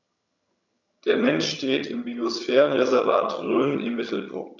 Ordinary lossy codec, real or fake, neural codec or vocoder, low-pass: none; fake; vocoder, 22.05 kHz, 80 mel bands, HiFi-GAN; 7.2 kHz